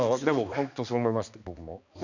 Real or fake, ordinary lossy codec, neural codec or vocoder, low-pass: fake; none; codec, 16 kHz, 2 kbps, X-Codec, HuBERT features, trained on balanced general audio; 7.2 kHz